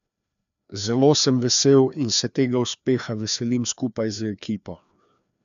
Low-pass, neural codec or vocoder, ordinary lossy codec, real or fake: 7.2 kHz; codec, 16 kHz, 2 kbps, FreqCodec, larger model; none; fake